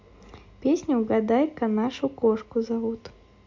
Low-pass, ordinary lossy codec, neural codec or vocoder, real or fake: 7.2 kHz; MP3, 48 kbps; none; real